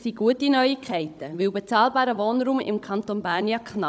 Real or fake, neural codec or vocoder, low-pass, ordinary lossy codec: fake; codec, 16 kHz, 16 kbps, FunCodec, trained on Chinese and English, 50 frames a second; none; none